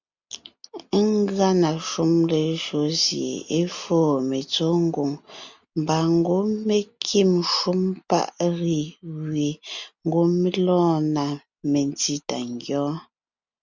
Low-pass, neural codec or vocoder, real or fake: 7.2 kHz; none; real